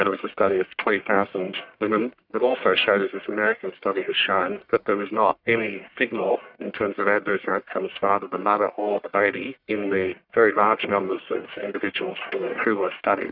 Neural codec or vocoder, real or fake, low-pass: codec, 44.1 kHz, 1.7 kbps, Pupu-Codec; fake; 5.4 kHz